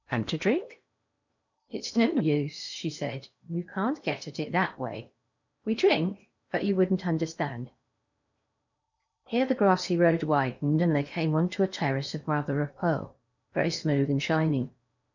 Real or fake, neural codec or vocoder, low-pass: fake; codec, 16 kHz in and 24 kHz out, 0.8 kbps, FocalCodec, streaming, 65536 codes; 7.2 kHz